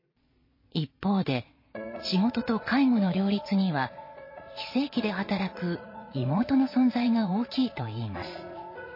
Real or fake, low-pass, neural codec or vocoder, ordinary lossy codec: fake; 5.4 kHz; vocoder, 22.05 kHz, 80 mel bands, Vocos; MP3, 24 kbps